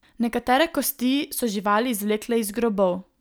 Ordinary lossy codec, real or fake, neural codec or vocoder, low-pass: none; real; none; none